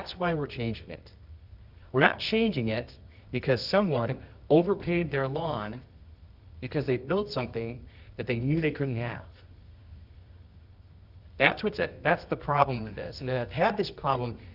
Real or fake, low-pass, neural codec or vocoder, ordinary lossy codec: fake; 5.4 kHz; codec, 24 kHz, 0.9 kbps, WavTokenizer, medium music audio release; Opus, 64 kbps